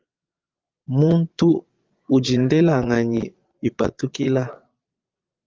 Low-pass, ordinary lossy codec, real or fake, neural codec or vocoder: 7.2 kHz; Opus, 32 kbps; fake; vocoder, 22.05 kHz, 80 mel bands, Vocos